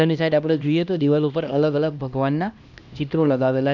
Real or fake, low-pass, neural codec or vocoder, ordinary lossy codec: fake; 7.2 kHz; codec, 16 kHz, 1 kbps, X-Codec, HuBERT features, trained on LibriSpeech; none